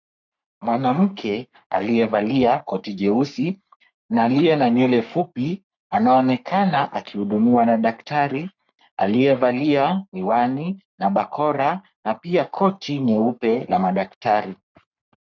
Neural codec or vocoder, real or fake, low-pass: codec, 44.1 kHz, 3.4 kbps, Pupu-Codec; fake; 7.2 kHz